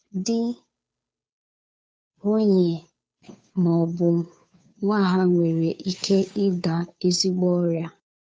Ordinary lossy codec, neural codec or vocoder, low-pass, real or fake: none; codec, 16 kHz, 2 kbps, FunCodec, trained on Chinese and English, 25 frames a second; none; fake